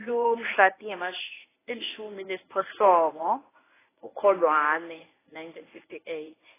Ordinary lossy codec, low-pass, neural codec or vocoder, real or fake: AAC, 16 kbps; 3.6 kHz; codec, 24 kHz, 0.9 kbps, WavTokenizer, medium speech release version 1; fake